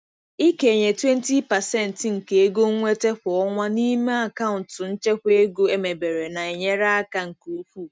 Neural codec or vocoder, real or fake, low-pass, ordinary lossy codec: none; real; none; none